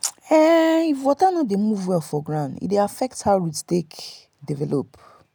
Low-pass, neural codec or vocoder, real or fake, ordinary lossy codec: none; none; real; none